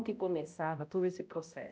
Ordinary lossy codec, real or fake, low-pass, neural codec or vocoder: none; fake; none; codec, 16 kHz, 0.5 kbps, X-Codec, HuBERT features, trained on balanced general audio